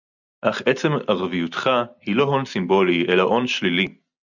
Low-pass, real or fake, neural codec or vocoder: 7.2 kHz; real; none